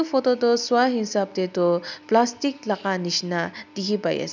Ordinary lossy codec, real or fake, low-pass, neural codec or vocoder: none; real; 7.2 kHz; none